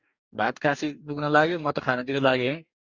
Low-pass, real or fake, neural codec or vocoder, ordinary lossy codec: 7.2 kHz; fake; codec, 44.1 kHz, 2.6 kbps, DAC; Opus, 64 kbps